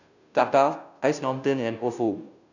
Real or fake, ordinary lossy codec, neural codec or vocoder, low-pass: fake; none; codec, 16 kHz, 0.5 kbps, FunCodec, trained on Chinese and English, 25 frames a second; 7.2 kHz